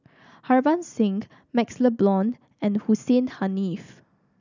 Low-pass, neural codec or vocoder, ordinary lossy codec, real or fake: 7.2 kHz; none; none; real